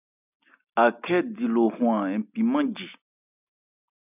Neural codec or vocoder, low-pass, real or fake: none; 3.6 kHz; real